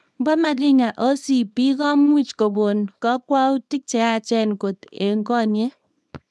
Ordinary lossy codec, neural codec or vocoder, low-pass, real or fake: none; codec, 24 kHz, 0.9 kbps, WavTokenizer, small release; none; fake